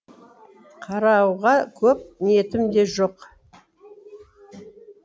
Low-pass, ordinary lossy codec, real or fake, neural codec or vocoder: none; none; real; none